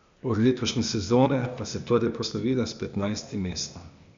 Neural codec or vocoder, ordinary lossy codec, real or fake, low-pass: codec, 16 kHz, 0.8 kbps, ZipCodec; MP3, 64 kbps; fake; 7.2 kHz